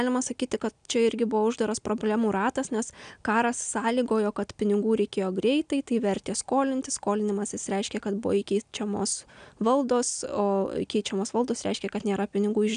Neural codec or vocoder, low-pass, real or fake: none; 9.9 kHz; real